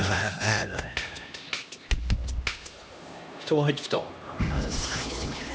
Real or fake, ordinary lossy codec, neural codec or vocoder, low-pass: fake; none; codec, 16 kHz, 1 kbps, X-Codec, HuBERT features, trained on LibriSpeech; none